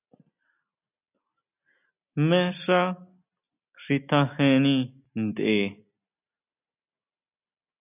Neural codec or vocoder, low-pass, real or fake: none; 3.6 kHz; real